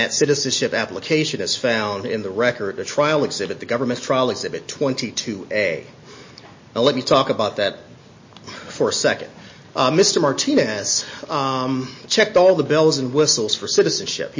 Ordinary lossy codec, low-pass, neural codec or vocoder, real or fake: MP3, 32 kbps; 7.2 kHz; none; real